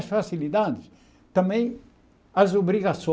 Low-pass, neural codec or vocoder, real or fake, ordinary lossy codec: none; none; real; none